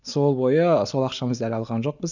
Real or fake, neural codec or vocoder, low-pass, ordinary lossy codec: real; none; 7.2 kHz; none